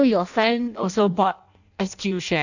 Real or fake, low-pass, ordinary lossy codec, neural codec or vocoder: fake; 7.2 kHz; none; codec, 16 kHz in and 24 kHz out, 0.6 kbps, FireRedTTS-2 codec